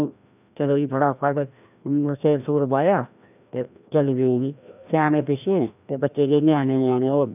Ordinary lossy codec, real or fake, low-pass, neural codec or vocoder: none; fake; 3.6 kHz; codec, 16 kHz, 1 kbps, FreqCodec, larger model